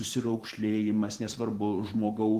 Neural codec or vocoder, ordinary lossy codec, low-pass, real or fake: vocoder, 44.1 kHz, 128 mel bands every 512 samples, BigVGAN v2; Opus, 16 kbps; 14.4 kHz; fake